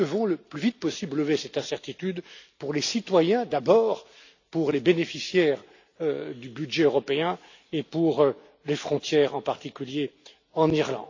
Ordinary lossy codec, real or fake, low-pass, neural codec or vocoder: AAC, 48 kbps; real; 7.2 kHz; none